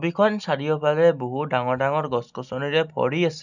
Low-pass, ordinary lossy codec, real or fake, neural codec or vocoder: 7.2 kHz; none; real; none